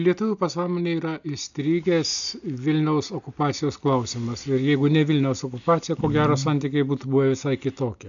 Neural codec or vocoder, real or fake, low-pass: none; real; 7.2 kHz